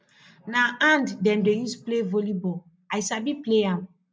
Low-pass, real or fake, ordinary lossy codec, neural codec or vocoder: none; real; none; none